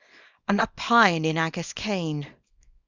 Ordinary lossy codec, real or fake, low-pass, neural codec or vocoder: Opus, 64 kbps; fake; 7.2 kHz; codec, 24 kHz, 0.9 kbps, WavTokenizer, small release